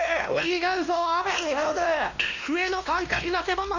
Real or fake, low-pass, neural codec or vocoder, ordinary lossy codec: fake; 7.2 kHz; codec, 16 kHz, 1 kbps, X-Codec, WavLM features, trained on Multilingual LibriSpeech; none